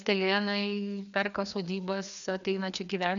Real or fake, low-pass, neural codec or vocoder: fake; 7.2 kHz; codec, 16 kHz, 2 kbps, FreqCodec, larger model